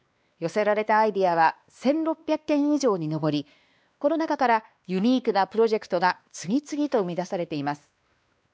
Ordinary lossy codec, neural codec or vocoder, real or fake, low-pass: none; codec, 16 kHz, 2 kbps, X-Codec, WavLM features, trained on Multilingual LibriSpeech; fake; none